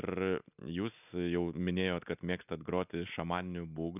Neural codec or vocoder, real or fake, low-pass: none; real; 3.6 kHz